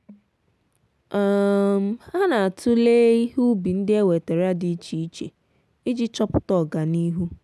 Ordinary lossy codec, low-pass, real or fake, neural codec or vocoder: none; none; real; none